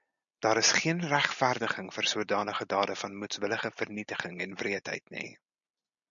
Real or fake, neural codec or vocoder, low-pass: real; none; 7.2 kHz